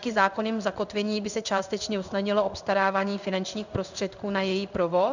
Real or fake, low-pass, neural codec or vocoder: fake; 7.2 kHz; codec, 16 kHz in and 24 kHz out, 1 kbps, XY-Tokenizer